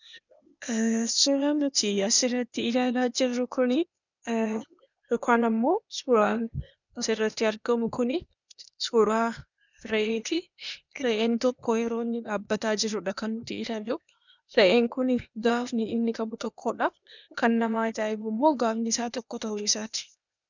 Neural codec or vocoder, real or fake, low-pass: codec, 16 kHz, 0.8 kbps, ZipCodec; fake; 7.2 kHz